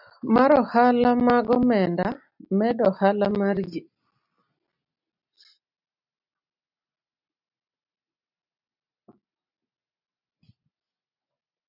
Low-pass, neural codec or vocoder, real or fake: 5.4 kHz; none; real